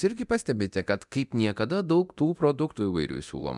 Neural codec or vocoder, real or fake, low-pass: codec, 24 kHz, 0.9 kbps, DualCodec; fake; 10.8 kHz